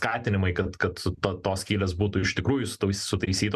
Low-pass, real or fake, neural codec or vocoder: 14.4 kHz; real; none